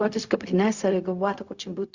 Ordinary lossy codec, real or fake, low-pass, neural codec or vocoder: Opus, 64 kbps; fake; 7.2 kHz; codec, 16 kHz, 0.4 kbps, LongCat-Audio-Codec